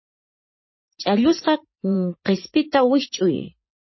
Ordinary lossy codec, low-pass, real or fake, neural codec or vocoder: MP3, 24 kbps; 7.2 kHz; fake; vocoder, 44.1 kHz, 80 mel bands, Vocos